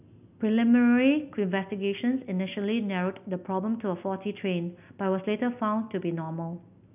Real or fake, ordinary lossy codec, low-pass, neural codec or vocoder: real; none; 3.6 kHz; none